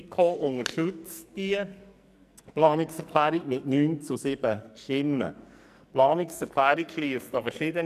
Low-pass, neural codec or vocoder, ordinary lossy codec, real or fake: 14.4 kHz; codec, 44.1 kHz, 2.6 kbps, SNAC; none; fake